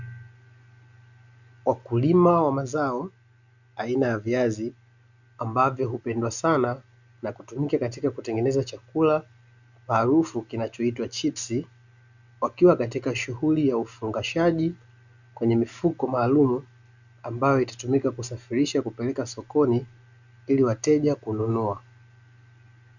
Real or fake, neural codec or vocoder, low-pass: real; none; 7.2 kHz